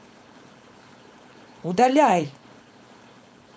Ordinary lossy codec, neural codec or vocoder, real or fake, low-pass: none; codec, 16 kHz, 4.8 kbps, FACodec; fake; none